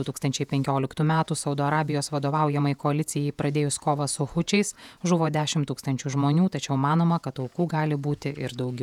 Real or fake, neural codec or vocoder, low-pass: fake; vocoder, 48 kHz, 128 mel bands, Vocos; 19.8 kHz